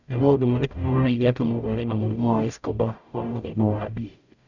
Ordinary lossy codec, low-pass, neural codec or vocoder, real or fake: none; 7.2 kHz; codec, 44.1 kHz, 0.9 kbps, DAC; fake